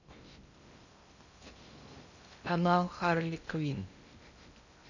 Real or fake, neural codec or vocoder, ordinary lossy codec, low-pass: fake; codec, 16 kHz in and 24 kHz out, 0.6 kbps, FocalCodec, streaming, 2048 codes; none; 7.2 kHz